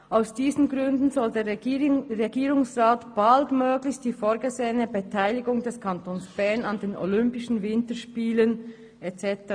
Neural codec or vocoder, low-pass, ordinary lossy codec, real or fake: none; 9.9 kHz; AAC, 64 kbps; real